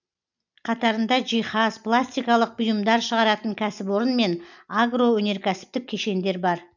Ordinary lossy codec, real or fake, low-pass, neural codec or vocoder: none; real; 7.2 kHz; none